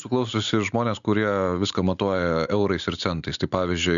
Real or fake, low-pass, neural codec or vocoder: real; 7.2 kHz; none